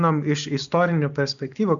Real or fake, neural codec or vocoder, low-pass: real; none; 7.2 kHz